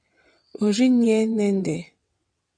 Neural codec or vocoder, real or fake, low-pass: vocoder, 22.05 kHz, 80 mel bands, WaveNeXt; fake; 9.9 kHz